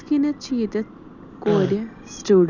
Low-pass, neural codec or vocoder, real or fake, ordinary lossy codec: 7.2 kHz; none; real; none